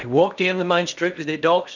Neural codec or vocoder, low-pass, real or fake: codec, 16 kHz in and 24 kHz out, 0.8 kbps, FocalCodec, streaming, 65536 codes; 7.2 kHz; fake